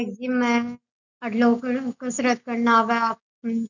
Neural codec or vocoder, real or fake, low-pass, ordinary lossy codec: none; real; 7.2 kHz; none